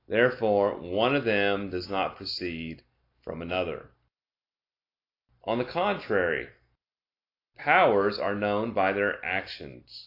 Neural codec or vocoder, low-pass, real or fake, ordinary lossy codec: none; 5.4 kHz; real; AAC, 32 kbps